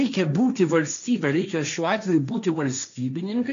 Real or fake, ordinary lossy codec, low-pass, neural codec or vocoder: fake; MP3, 96 kbps; 7.2 kHz; codec, 16 kHz, 1.1 kbps, Voila-Tokenizer